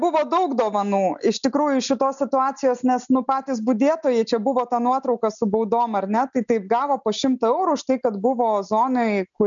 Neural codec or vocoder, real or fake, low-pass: none; real; 7.2 kHz